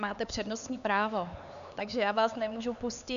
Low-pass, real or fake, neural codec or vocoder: 7.2 kHz; fake; codec, 16 kHz, 4 kbps, X-Codec, HuBERT features, trained on LibriSpeech